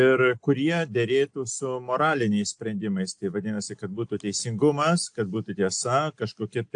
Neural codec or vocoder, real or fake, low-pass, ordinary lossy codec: none; real; 9.9 kHz; AAC, 64 kbps